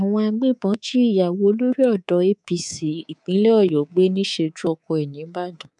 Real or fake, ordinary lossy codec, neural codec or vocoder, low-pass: fake; none; codec, 24 kHz, 3.1 kbps, DualCodec; 10.8 kHz